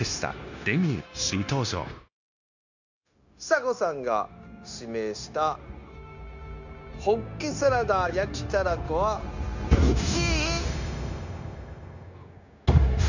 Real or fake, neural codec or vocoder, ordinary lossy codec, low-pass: fake; codec, 16 kHz, 0.9 kbps, LongCat-Audio-Codec; none; 7.2 kHz